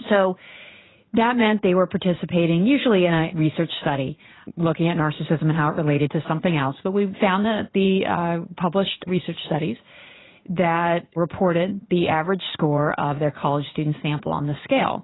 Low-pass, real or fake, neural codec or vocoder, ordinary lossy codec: 7.2 kHz; fake; vocoder, 44.1 kHz, 80 mel bands, Vocos; AAC, 16 kbps